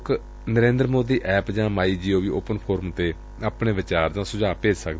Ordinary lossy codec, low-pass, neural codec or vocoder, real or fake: none; none; none; real